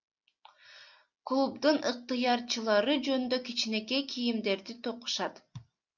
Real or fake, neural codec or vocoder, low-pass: real; none; 7.2 kHz